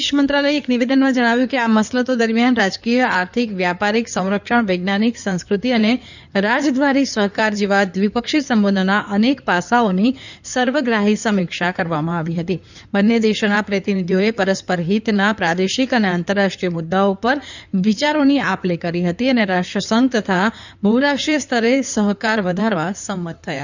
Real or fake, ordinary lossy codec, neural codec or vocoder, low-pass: fake; none; codec, 16 kHz in and 24 kHz out, 2.2 kbps, FireRedTTS-2 codec; 7.2 kHz